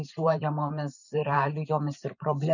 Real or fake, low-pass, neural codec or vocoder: fake; 7.2 kHz; vocoder, 44.1 kHz, 128 mel bands every 512 samples, BigVGAN v2